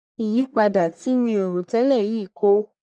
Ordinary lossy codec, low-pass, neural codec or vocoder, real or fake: none; 9.9 kHz; codec, 44.1 kHz, 1.7 kbps, Pupu-Codec; fake